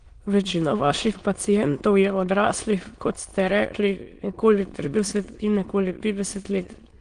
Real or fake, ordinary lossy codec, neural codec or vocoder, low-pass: fake; Opus, 24 kbps; autoencoder, 22.05 kHz, a latent of 192 numbers a frame, VITS, trained on many speakers; 9.9 kHz